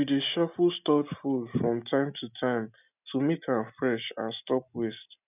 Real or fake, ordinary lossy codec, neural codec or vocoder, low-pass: real; none; none; 3.6 kHz